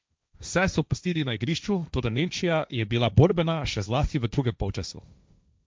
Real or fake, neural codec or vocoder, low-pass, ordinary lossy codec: fake; codec, 16 kHz, 1.1 kbps, Voila-Tokenizer; none; none